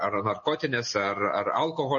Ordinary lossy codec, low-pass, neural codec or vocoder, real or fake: MP3, 32 kbps; 7.2 kHz; none; real